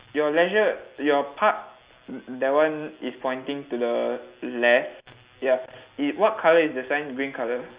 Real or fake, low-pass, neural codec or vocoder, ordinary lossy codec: real; 3.6 kHz; none; Opus, 64 kbps